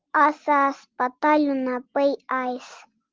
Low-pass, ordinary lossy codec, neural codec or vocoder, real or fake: 7.2 kHz; Opus, 16 kbps; none; real